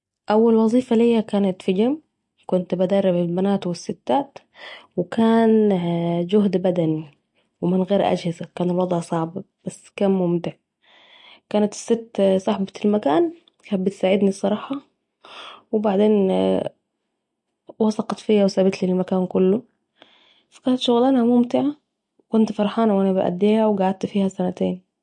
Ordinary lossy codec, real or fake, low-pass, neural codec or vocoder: MP3, 48 kbps; real; 10.8 kHz; none